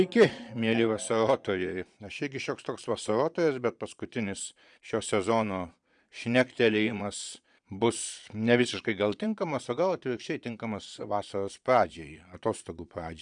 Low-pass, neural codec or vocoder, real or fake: 9.9 kHz; vocoder, 22.05 kHz, 80 mel bands, Vocos; fake